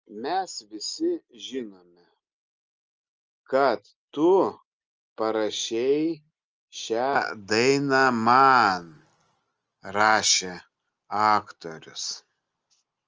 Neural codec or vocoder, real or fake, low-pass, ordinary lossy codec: none; real; 7.2 kHz; Opus, 24 kbps